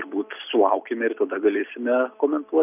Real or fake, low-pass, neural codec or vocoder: real; 3.6 kHz; none